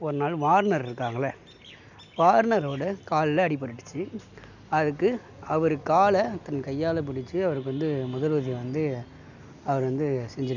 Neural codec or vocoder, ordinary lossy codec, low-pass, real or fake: none; none; 7.2 kHz; real